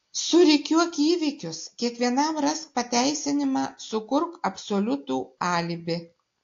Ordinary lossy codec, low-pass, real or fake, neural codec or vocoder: AAC, 48 kbps; 7.2 kHz; real; none